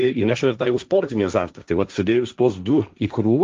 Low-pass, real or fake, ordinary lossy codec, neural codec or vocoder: 7.2 kHz; fake; Opus, 32 kbps; codec, 16 kHz, 1.1 kbps, Voila-Tokenizer